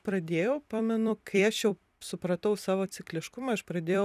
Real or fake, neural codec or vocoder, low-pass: fake; vocoder, 44.1 kHz, 128 mel bands every 256 samples, BigVGAN v2; 14.4 kHz